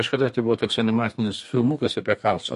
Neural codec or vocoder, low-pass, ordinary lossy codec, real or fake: codec, 32 kHz, 1.9 kbps, SNAC; 14.4 kHz; MP3, 48 kbps; fake